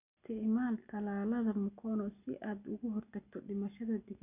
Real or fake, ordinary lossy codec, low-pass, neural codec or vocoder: real; none; 3.6 kHz; none